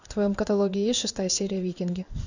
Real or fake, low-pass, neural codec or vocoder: fake; 7.2 kHz; codec, 16 kHz in and 24 kHz out, 1 kbps, XY-Tokenizer